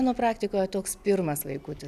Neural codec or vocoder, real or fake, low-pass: none; real; 14.4 kHz